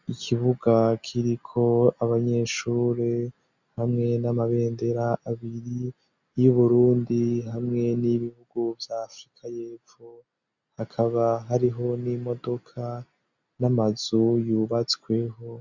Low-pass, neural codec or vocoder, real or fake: 7.2 kHz; none; real